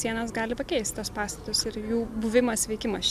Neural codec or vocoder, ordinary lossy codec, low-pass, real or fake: none; MP3, 96 kbps; 14.4 kHz; real